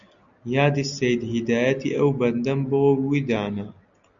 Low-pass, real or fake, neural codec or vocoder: 7.2 kHz; real; none